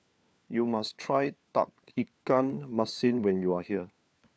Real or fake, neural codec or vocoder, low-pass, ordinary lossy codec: fake; codec, 16 kHz, 4 kbps, FunCodec, trained on LibriTTS, 50 frames a second; none; none